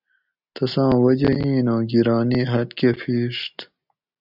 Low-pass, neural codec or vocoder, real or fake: 5.4 kHz; none; real